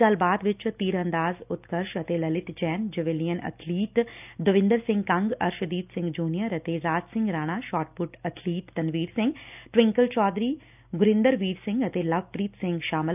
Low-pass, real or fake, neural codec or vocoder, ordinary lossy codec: 3.6 kHz; real; none; none